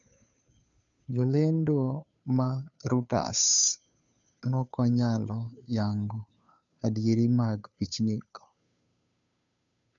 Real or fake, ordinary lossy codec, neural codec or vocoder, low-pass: fake; MP3, 96 kbps; codec, 16 kHz, 2 kbps, FunCodec, trained on Chinese and English, 25 frames a second; 7.2 kHz